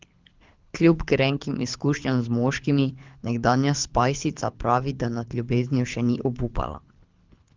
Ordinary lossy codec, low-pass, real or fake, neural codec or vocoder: Opus, 32 kbps; 7.2 kHz; fake; codec, 24 kHz, 6 kbps, HILCodec